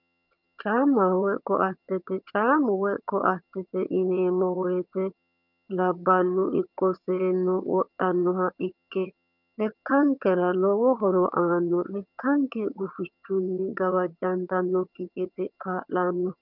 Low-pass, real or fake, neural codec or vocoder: 5.4 kHz; fake; vocoder, 22.05 kHz, 80 mel bands, HiFi-GAN